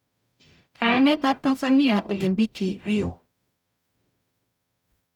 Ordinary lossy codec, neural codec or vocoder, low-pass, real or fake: none; codec, 44.1 kHz, 0.9 kbps, DAC; 19.8 kHz; fake